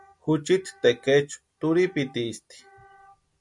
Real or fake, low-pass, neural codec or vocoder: real; 10.8 kHz; none